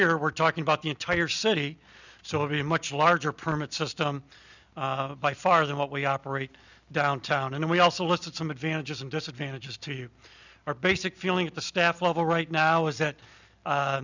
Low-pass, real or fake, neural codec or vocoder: 7.2 kHz; real; none